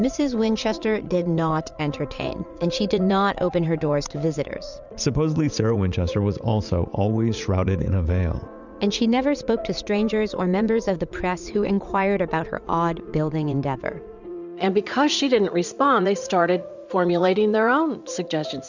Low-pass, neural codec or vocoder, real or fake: 7.2 kHz; vocoder, 44.1 kHz, 80 mel bands, Vocos; fake